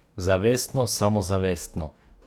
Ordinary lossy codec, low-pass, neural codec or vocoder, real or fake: none; 19.8 kHz; codec, 44.1 kHz, 2.6 kbps, DAC; fake